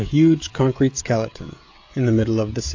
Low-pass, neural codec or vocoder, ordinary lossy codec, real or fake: 7.2 kHz; codec, 16 kHz, 16 kbps, FreqCodec, smaller model; AAC, 48 kbps; fake